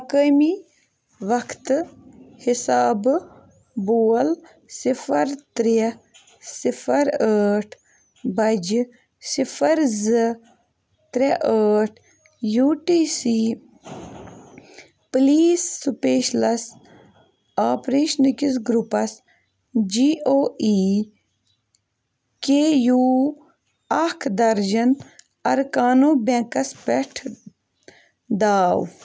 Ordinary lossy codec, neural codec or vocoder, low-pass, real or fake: none; none; none; real